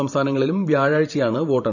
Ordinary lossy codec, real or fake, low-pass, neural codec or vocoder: none; fake; 7.2 kHz; vocoder, 44.1 kHz, 128 mel bands every 512 samples, BigVGAN v2